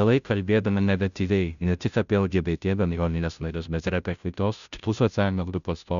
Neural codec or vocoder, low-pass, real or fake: codec, 16 kHz, 0.5 kbps, FunCodec, trained on Chinese and English, 25 frames a second; 7.2 kHz; fake